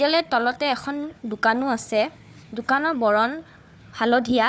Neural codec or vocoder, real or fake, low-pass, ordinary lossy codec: codec, 16 kHz, 4 kbps, FunCodec, trained on Chinese and English, 50 frames a second; fake; none; none